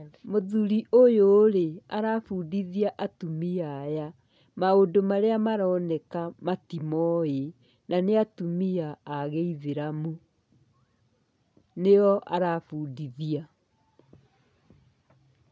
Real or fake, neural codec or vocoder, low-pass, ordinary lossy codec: real; none; none; none